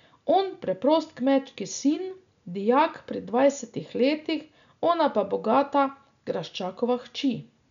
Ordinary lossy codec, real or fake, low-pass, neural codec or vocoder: none; real; 7.2 kHz; none